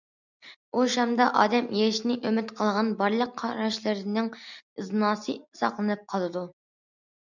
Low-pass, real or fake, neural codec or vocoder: 7.2 kHz; real; none